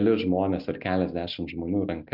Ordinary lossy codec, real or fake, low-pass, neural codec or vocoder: MP3, 48 kbps; real; 5.4 kHz; none